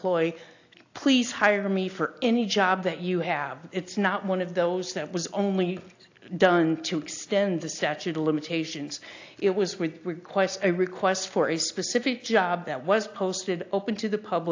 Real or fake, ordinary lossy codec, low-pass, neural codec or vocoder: real; AAC, 48 kbps; 7.2 kHz; none